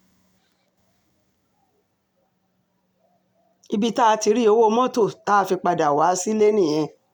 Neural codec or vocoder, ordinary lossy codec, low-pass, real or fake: none; none; 19.8 kHz; real